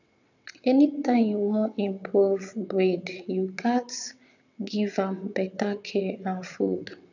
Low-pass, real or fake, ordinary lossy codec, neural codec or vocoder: 7.2 kHz; fake; none; vocoder, 22.05 kHz, 80 mel bands, WaveNeXt